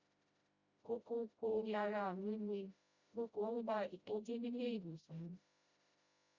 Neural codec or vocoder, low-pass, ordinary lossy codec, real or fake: codec, 16 kHz, 0.5 kbps, FreqCodec, smaller model; 7.2 kHz; Opus, 64 kbps; fake